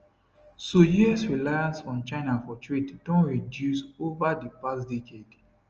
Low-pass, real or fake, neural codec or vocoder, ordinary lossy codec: 7.2 kHz; real; none; Opus, 24 kbps